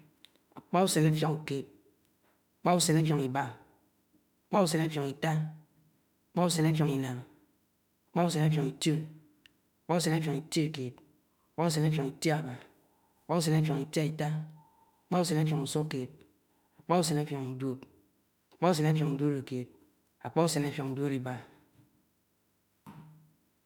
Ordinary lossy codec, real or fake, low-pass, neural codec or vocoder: none; fake; 19.8 kHz; autoencoder, 48 kHz, 32 numbers a frame, DAC-VAE, trained on Japanese speech